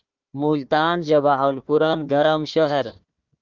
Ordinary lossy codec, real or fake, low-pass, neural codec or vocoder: Opus, 24 kbps; fake; 7.2 kHz; codec, 16 kHz, 1 kbps, FunCodec, trained on Chinese and English, 50 frames a second